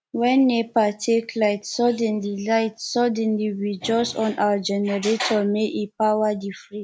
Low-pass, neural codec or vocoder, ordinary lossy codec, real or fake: none; none; none; real